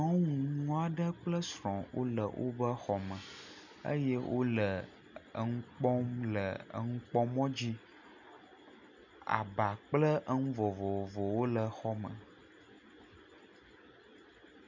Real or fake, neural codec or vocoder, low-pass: real; none; 7.2 kHz